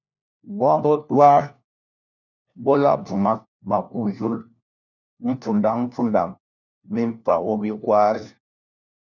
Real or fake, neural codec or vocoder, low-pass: fake; codec, 16 kHz, 1 kbps, FunCodec, trained on LibriTTS, 50 frames a second; 7.2 kHz